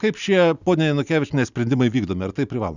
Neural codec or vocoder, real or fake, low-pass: none; real; 7.2 kHz